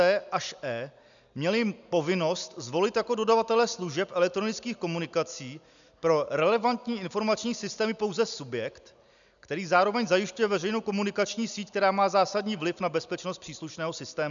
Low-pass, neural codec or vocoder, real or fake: 7.2 kHz; none; real